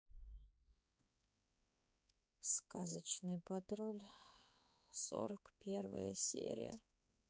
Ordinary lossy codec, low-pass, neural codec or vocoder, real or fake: none; none; codec, 16 kHz, 4 kbps, X-Codec, HuBERT features, trained on balanced general audio; fake